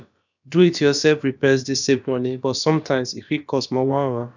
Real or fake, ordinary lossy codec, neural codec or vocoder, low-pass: fake; none; codec, 16 kHz, about 1 kbps, DyCAST, with the encoder's durations; 7.2 kHz